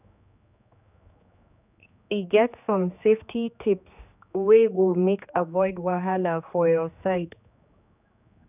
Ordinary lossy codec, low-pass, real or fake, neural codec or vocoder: none; 3.6 kHz; fake; codec, 16 kHz, 2 kbps, X-Codec, HuBERT features, trained on general audio